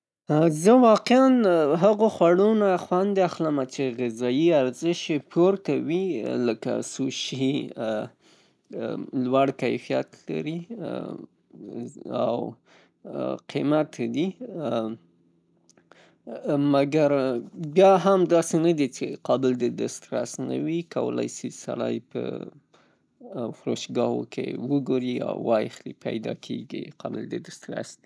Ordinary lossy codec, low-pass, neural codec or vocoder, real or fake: none; none; none; real